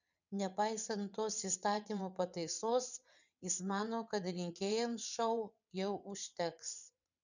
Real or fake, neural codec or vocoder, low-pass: fake; vocoder, 22.05 kHz, 80 mel bands, WaveNeXt; 7.2 kHz